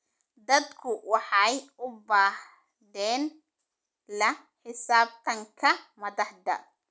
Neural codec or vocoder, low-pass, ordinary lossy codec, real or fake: none; none; none; real